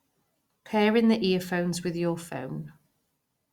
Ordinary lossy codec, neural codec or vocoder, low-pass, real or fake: Opus, 64 kbps; none; 19.8 kHz; real